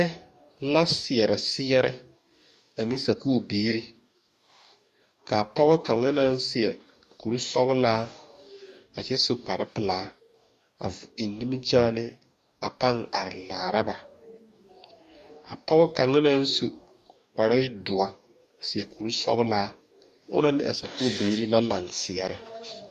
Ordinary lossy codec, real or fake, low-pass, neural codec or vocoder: MP3, 96 kbps; fake; 14.4 kHz; codec, 44.1 kHz, 2.6 kbps, DAC